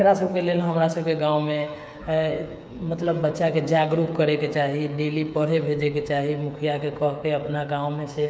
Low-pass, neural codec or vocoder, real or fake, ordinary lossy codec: none; codec, 16 kHz, 8 kbps, FreqCodec, smaller model; fake; none